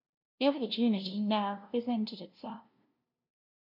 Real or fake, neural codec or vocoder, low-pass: fake; codec, 16 kHz, 0.5 kbps, FunCodec, trained on LibriTTS, 25 frames a second; 5.4 kHz